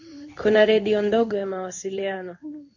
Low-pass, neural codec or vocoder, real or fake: 7.2 kHz; codec, 16 kHz in and 24 kHz out, 1 kbps, XY-Tokenizer; fake